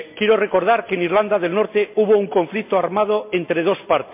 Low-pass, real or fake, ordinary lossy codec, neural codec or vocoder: 3.6 kHz; real; MP3, 32 kbps; none